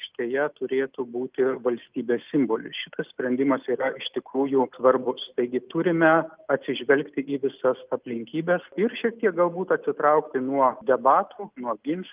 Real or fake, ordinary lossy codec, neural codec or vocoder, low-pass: real; Opus, 32 kbps; none; 3.6 kHz